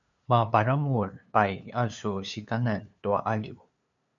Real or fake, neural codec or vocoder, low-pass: fake; codec, 16 kHz, 2 kbps, FunCodec, trained on LibriTTS, 25 frames a second; 7.2 kHz